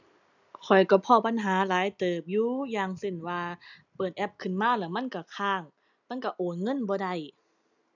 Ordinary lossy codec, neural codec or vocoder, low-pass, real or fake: none; none; 7.2 kHz; real